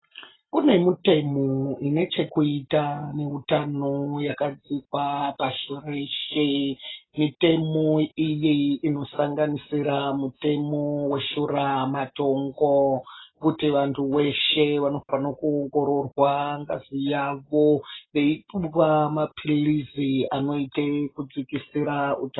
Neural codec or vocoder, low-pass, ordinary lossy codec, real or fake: none; 7.2 kHz; AAC, 16 kbps; real